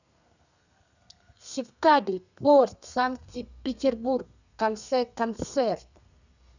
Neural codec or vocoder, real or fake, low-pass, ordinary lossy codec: codec, 32 kHz, 1.9 kbps, SNAC; fake; 7.2 kHz; none